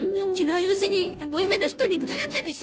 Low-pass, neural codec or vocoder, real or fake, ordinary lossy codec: none; codec, 16 kHz, 0.5 kbps, FunCodec, trained on Chinese and English, 25 frames a second; fake; none